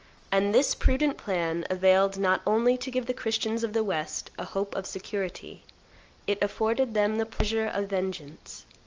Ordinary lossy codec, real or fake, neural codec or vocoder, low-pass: Opus, 24 kbps; real; none; 7.2 kHz